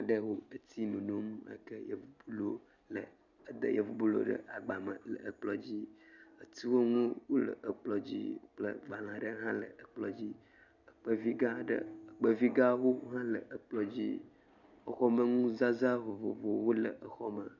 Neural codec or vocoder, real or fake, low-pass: none; real; 7.2 kHz